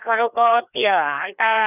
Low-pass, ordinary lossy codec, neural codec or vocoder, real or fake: 3.6 kHz; none; codec, 16 kHz, 2 kbps, FreqCodec, larger model; fake